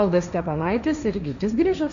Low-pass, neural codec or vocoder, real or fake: 7.2 kHz; codec, 16 kHz, 1.1 kbps, Voila-Tokenizer; fake